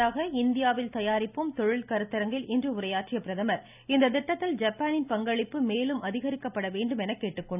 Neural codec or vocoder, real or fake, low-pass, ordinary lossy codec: none; real; 3.6 kHz; none